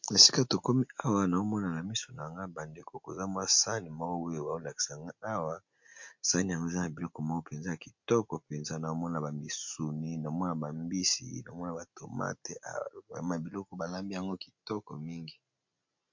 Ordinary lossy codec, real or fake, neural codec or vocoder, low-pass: MP3, 48 kbps; real; none; 7.2 kHz